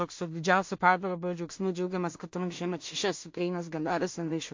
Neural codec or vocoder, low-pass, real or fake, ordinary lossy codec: codec, 16 kHz in and 24 kHz out, 0.4 kbps, LongCat-Audio-Codec, two codebook decoder; 7.2 kHz; fake; MP3, 48 kbps